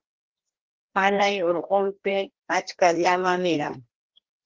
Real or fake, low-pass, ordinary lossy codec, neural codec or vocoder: fake; 7.2 kHz; Opus, 16 kbps; codec, 16 kHz, 1 kbps, FreqCodec, larger model